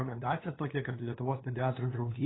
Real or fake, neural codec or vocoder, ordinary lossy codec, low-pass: fake; codec, 16 kHz, 2 kbps, FunCodec, trained on LibriTTS, 25 frames a second; AAC, 16 kbps; 7.2 kHz